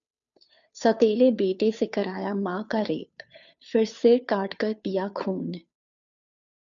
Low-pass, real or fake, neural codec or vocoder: 7.2 kHz; fake; codec, 16 kHz, 2 kbps, FunCodec, trained on Chinese and English, 25 frames a second